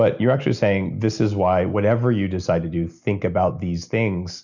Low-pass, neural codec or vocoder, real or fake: 7.2 kHz; none; real